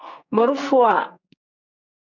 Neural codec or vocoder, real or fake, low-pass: codec, 44.1 kHz, 2.6 kbps, SNAC; fake; 7.2 kHz